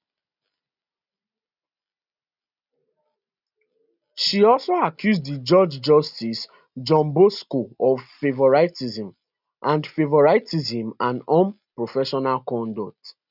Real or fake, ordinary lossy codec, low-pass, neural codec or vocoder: real; none; 5.4 kHz; none